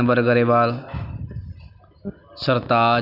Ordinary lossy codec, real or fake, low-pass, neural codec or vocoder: none; real; 5.4 kHz; none